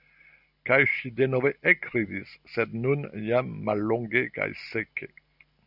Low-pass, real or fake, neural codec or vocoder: 5.4 kHz; real; none